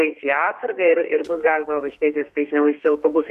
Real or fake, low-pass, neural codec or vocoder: fake; 14.4 kHz; codec, 32 kHz, 1.9 kbps, SNAC